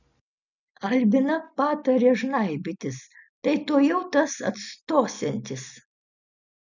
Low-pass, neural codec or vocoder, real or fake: 7.2 kHz; none; real